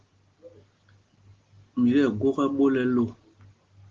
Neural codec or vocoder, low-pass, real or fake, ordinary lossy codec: none; 7.2 kHz; real; Opus, 16 kbps